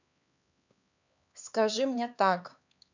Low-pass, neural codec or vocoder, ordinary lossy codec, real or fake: 7.2 kHz; codec, 16 kHz, 4 kbps, X-Codec, HuBERT features, trained on LibriSpeech; none; fake